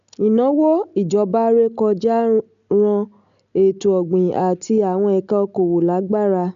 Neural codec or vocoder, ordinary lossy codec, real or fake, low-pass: none; AAC, 96 kbps; real; 7.2 kHz